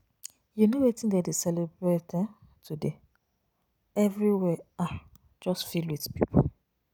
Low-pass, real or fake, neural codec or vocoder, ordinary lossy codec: none; real; none; none